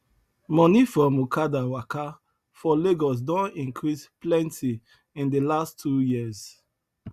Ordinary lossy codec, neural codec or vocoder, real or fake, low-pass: Opus, 64 kbps; none; real; 14.4 kHz